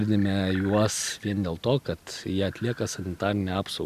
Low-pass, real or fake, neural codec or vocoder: 14.4 kHz; real; none